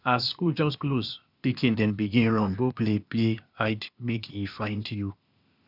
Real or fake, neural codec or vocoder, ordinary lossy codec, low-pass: fake; codec, 16 kHz, 0.8 kbps, ZipCodec; none; 5.4 kHz